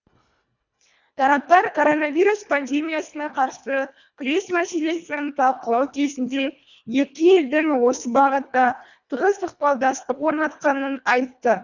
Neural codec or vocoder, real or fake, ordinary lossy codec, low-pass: codec, 24 kHz, 1.5 kbps, HILCodec; fake; none; 7.2 kHz